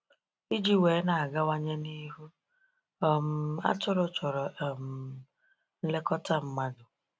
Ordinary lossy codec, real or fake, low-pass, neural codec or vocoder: none; real; none; none